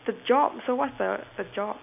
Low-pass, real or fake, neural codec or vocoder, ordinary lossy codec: 3.6 kHz; real; none; none